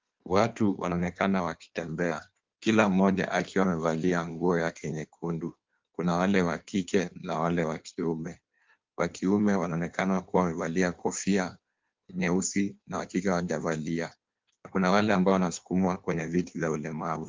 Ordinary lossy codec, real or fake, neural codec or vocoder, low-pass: Opus, 32 kbps; fake; codec, 16 kHz in and 24 kHz out, 1.1 kbps, FireRedTTS-2 codec; 7.2 kHz